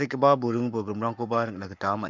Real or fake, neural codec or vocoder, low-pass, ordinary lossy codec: fake; codec, 44.1 kHz, 7.8 kbps, Pupu-Codec; 7.2 kHz; MP3, 64 kbps